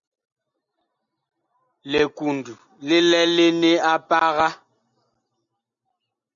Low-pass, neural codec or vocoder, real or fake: 7.2 kHz; none; real